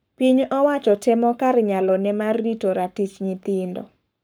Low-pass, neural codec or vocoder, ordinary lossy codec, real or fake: none; codec, 44.1 kHz, 7.8 kbps, Pupu-Codec; none; fake